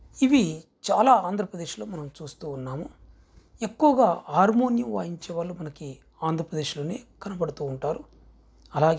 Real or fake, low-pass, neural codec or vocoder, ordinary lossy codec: real; none; none; none